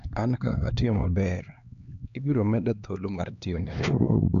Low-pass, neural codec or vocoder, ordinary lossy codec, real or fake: 7.2 kHz; codec, 16 kHz, 2 kbps, X-Codec, HuBERT features, trained on LibriSpeech; none; fake